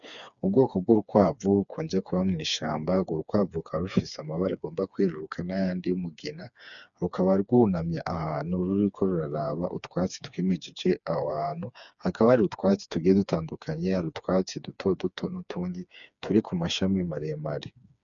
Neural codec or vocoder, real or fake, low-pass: codec, 16 kHz, 4 kbps, FreqCodec, smaller model; fake; 7.2 kHz